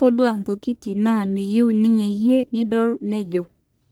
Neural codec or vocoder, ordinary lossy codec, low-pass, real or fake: codec, 44.1 kHz, 1.7 kbps, Pupu-Codec; none; none; fake